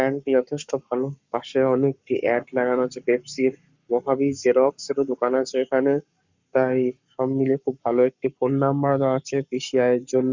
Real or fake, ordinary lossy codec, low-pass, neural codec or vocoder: fake; Opus, 64 kbps; 7.2 kHz; codec, 44.1 kHz, 7.8 kbps, Pupu-Codec